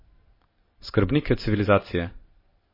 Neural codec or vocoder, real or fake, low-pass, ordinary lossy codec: none; real; 5.4 kHz; MP3, 24 kbps